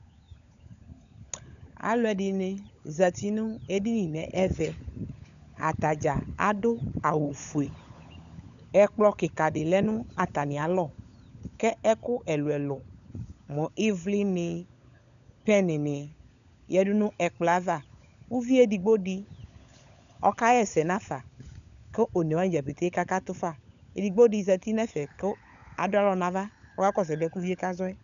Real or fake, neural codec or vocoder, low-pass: fake; codec, 16 kHz, 16 kbps, FunCodec, trained on LibriTTS, 50 frames a second; 7.2 kHz